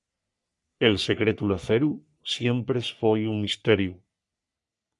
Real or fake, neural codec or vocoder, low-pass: fake; codec, 44.1 kHz, 3.4 kbps, Pupu-Codec; 10.8 kHz